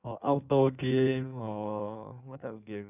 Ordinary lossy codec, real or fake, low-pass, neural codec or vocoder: none; fake; 3.6 kHz; codec, 16 kHz in and 24 kHz out, 1.1 kbps, FireRedTTS-2 codec